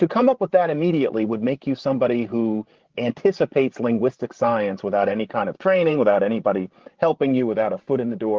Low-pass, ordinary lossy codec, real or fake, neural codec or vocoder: 7.2 kHz; Opus, 16 kbps; fake; codec, 16 kHz, 16 kbps, FreqCodec, larger model